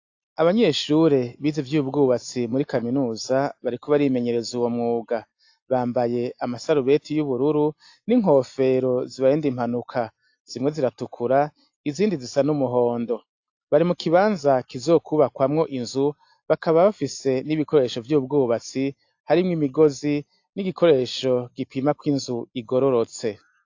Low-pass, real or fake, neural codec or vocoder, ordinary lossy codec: 7.2 kHz; real; none; AAC, 48 kbps